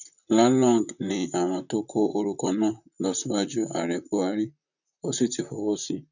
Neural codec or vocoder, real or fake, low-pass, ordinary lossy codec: vocoder, 44.1 kHz, 128 mel bands, Pupu-Vocoder; fake; 7.2 kHz; none